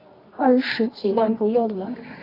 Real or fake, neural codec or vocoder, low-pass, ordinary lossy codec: fake; codec, 24 kHz, 0.9 kbps, WavTokenizer, medium music audio release; 5.4 kHz; AAC, 24 kbps